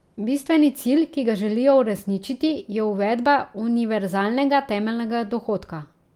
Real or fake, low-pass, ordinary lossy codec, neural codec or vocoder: real; 19.8 kHz; Opus, 32 kbps; none